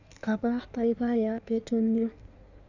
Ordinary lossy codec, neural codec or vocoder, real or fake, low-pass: none; codec, 16 kHz in and 24 kHz out, 1.1 kbps, FireRedTTS-2 codec; fake; 7.2 kHz